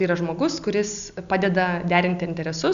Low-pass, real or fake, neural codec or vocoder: 7.2 kHz; real; none